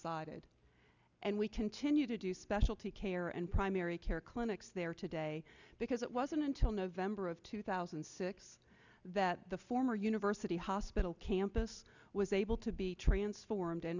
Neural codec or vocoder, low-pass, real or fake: none; 7.2 kHz; real